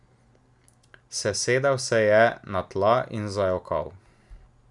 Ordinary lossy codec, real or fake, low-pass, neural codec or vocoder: none; real; 10.8 kHz; none